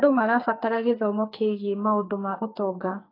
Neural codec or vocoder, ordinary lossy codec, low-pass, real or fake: codec, 44.1 kHz, 2.6 kbps, SNAC; AAC, 24 kbps; 5.4 kHz; fake